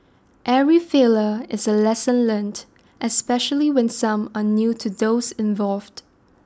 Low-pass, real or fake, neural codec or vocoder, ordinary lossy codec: none; real; none; none